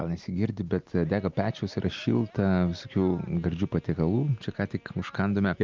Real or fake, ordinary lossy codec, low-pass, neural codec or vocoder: real; Opus, 24 kbps; 7.2 kHz; none